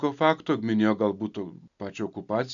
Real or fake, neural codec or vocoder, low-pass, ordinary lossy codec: real; none; 7.2 kHz; MP3, 96 kbps